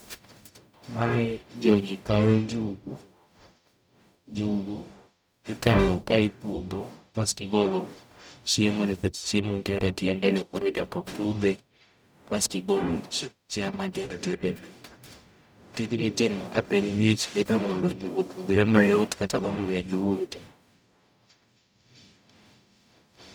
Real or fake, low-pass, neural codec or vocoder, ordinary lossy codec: fake; none; codec, 44.1 kHz, 0.9 kbps, DAC; none